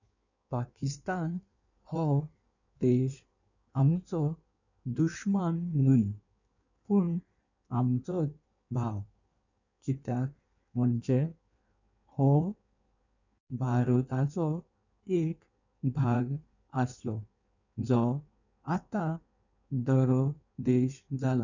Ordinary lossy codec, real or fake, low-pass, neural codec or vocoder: none; fake; 7.2 kHz; codec, 16 kHz in and 24 kHz out, 1.1 kbps, FireRedTTS-2 codec